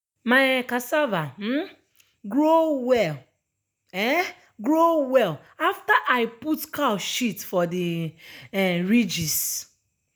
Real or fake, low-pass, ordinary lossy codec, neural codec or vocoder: real; none; none; none